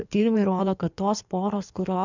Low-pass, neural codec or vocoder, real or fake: 7.2 kHz; codec, 16 kHz in and 24 kHz out, 1.1 kbps, FireRedTTS-2 codec; fake